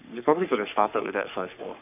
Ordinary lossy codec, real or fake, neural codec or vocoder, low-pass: none; fake; codec, 44.1 kHz, 3.4 kbps, Pupu-Codec; 3.6 kHz